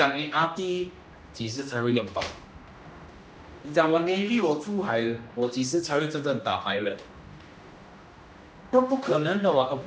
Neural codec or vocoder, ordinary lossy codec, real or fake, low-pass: codec, 16 kHz, 1 kbps, X-Codec, HuBERT features, trained on general audio; none; fake; none